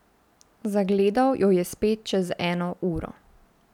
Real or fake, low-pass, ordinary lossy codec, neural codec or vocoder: real; 19.8 kHz; none; none